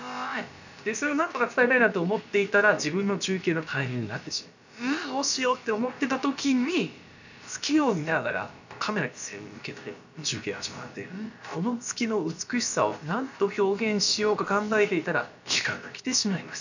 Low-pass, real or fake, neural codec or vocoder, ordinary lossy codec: 7.2 kHz; fake; codec, 16 kHz, about 1 kbps, DyCAST, with the encoder's durations; none